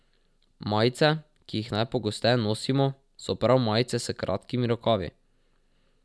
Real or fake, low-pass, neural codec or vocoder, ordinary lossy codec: real; none; none; none